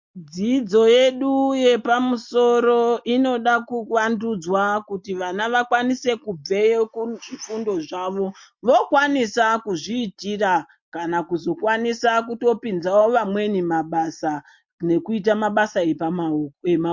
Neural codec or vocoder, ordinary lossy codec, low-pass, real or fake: none; MP3, 48 kbps; 7.2 kHz; real